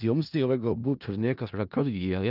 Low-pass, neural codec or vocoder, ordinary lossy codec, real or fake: 5.4 kHz; codec, 16 kHz in and 24 kHz out, 0.4 kbps, LongCat-Audio-Codec, four codebook decoder; Opus, 24 kbps; fake